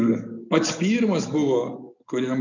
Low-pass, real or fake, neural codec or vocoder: 7.2 kHz; real; none